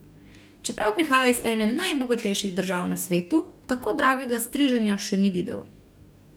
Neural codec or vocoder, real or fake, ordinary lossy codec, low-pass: codec, 44.1 kHz, 2.6 kbps, DAC; fake; none; none